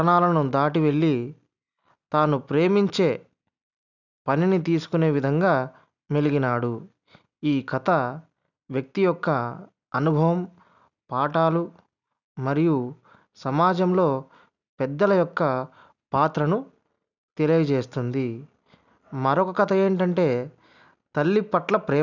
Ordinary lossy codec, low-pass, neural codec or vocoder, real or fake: none; 7.2 kHz; none; real